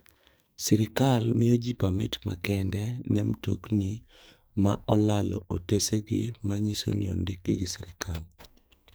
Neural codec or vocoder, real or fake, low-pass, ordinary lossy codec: codec, 44.1 kHz, 2.6 kbps, SNAC; fake; none; none